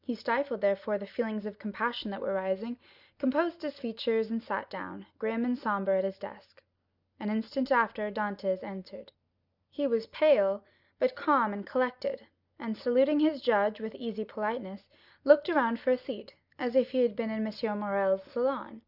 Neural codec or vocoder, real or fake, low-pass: none; real; 5.4 kHz